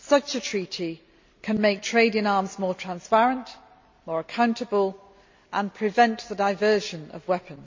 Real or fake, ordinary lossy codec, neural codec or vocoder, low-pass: real; MP3, 48 kbps; none; 7.2 kHz